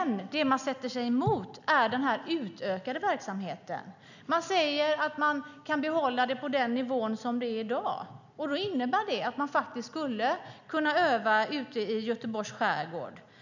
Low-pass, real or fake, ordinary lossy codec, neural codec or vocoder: 7.2 kHz; real; none; none